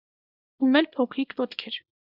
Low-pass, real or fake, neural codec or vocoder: 5.4 kHz; fake; codec, 24 kHz, 0.9 kbps, WavTokenizer, small release